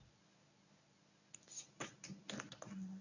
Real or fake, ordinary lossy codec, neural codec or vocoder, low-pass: fake; none; codec, 24 kHz, 0.9 kbps, WavTokenizer, medium speech release version 1; 7.2 kHz